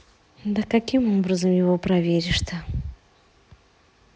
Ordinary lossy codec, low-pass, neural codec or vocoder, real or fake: none; none; none; real